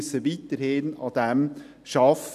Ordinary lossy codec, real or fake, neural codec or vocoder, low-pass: none; real; none; 14.4 kHz